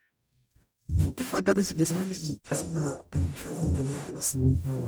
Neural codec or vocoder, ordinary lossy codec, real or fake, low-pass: codec, 44.1 kHz, 0.9 kbps, DAC; none; fake; none